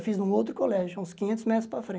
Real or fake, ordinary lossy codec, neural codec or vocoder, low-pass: real; none; none; none